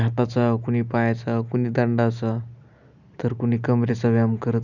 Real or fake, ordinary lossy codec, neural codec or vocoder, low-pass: real; none; none; 7.2 kHz